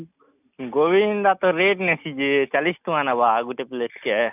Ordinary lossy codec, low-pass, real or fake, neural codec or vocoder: none; 3.6 kHz; real; none